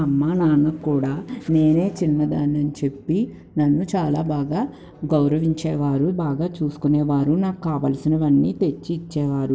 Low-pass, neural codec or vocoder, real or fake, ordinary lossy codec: none; none; real; none